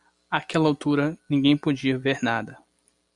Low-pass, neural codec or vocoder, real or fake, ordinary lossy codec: 10.8 kHz; none; real; MP3, 96 kbps